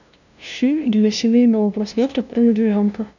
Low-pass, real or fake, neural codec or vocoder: 7.2 kHz; fake; codec, 16 kHz, 0.5 kbps, FunCodec, trained on LibriTTS, 25 frames a second